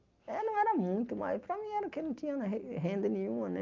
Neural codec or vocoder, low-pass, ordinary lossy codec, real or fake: none; 7.2 kHz; Opus, 24 kbps; real